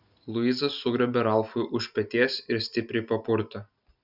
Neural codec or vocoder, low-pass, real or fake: none; 5.4 kHz; real